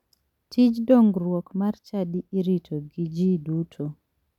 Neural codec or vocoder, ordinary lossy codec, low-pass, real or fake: none; none; 19.8 kHz; real